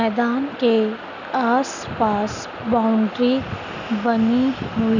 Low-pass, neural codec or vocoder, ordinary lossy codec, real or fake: 7.2 kHz; none; none; real